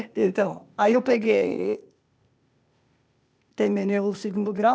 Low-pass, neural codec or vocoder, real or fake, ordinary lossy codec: none; codec, 16 kHz, 0.8 kbps, ZipCodec; fake; none